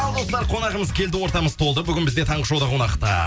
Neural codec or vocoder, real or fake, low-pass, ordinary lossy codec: none; real; none; none